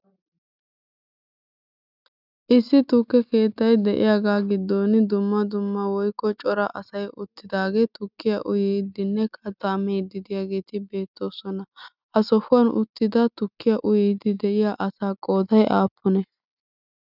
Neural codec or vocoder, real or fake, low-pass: none; real; 5.4 kHz